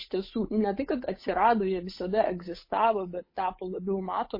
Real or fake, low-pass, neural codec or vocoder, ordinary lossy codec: fake; 5.4 kHz; codec, 16 kHz, 8 kbps, FunCodec, trained on Chinese and English, 25 frames a second; MP3, 24 kbps